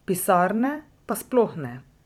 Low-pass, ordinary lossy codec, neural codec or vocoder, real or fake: 19.8 kHz; none; vocoder, 44.1 kHz, 128 mel bands every 256 samples, BigVGAN v2; fake